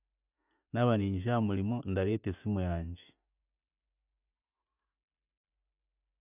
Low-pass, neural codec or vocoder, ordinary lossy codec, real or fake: 3.6 kHz; none; none; real